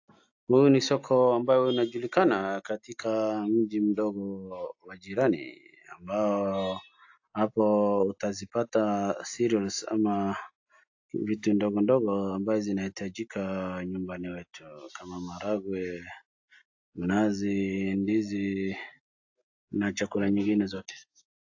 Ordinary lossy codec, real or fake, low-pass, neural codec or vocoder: AAC, 48 kbps; real; 7.2 kHz; none